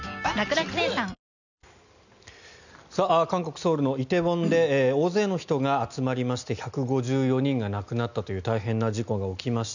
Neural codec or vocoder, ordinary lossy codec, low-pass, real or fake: none; none; 7.2 kHz; real